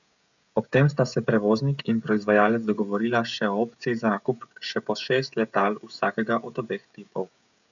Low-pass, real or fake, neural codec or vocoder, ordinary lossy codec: 7.2 kHz; fake; codec, 16 kHz, 16 kbps, FreqCodec, smaller model; none